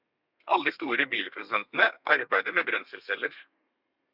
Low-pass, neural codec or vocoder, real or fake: 5.4 kHz; codec, 32 kHz, 1.9 kbps, SNAC; fake